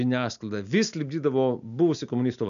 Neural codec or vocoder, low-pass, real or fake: none; 7.2 kHz; real